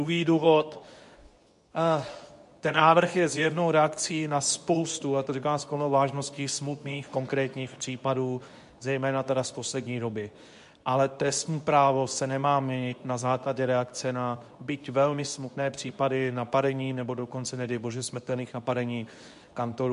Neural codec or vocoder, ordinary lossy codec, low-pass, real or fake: codec, 24 kHz, 0.9 kbps, WavTokenizer, medium speech release version 2; MP3, 64 kbps; 10.8 kHz; fake